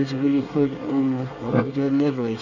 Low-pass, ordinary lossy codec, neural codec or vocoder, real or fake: 7.2 kHz; none; codec, 24 kHz, 1 kbps, SNAC; fake